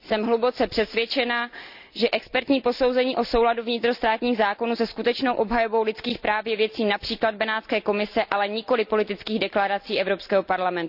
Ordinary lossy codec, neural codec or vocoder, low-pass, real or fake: none; none; 5.4 kHz; real